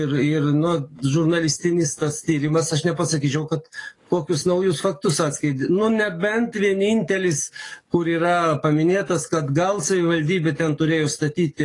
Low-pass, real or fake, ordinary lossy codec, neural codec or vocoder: 10.8 kHz; fake; AAC, 32 kbps; codec, 44.1 kHz, 7.8 kbps, DAC